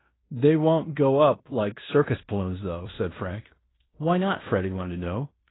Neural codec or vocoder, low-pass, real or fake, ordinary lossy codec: codec, 16 kHz in and 24 kHz out, 0.9 kbps, LongCat-Audio-Codec, fine tuned four codebook decoder; 7.2 kHz; fake; AAC, 16 kbps